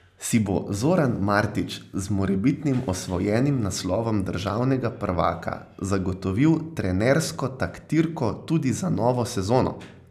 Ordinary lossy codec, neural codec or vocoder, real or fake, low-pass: none; none; real; 14.4 kHz